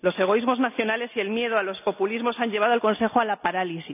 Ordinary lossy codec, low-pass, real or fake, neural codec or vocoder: none; 3.6 kHz; real; none